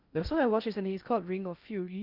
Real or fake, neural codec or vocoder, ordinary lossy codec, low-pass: fake; codec, 16 kHz in and 24 kHz out, 0.6 kbps, FocalCodec, streaming, 4096 codes; Opus, 64 kbps; 5.4 kHz